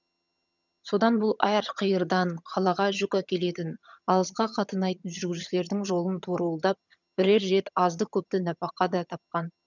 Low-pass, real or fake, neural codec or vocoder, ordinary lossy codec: 7.2 kHz; fake; vocoder, 22.05 kHz, 80 mel bands, HiFi-GAN; none